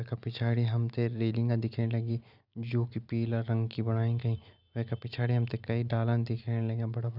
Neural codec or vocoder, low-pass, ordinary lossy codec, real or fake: none; 5.4 kHz; none; real